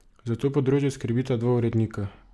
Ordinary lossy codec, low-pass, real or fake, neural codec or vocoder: none; none; real; none